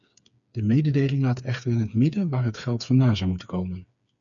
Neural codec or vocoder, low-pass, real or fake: codec, 16 kHz, 4 kbps, FreqCodec, smaller model; 7.2 kHz; fake